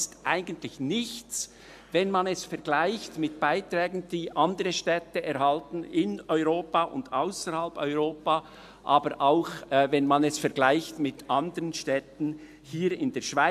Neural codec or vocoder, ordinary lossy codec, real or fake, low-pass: none; AAC, 96 kbps; real; 14.4 kHz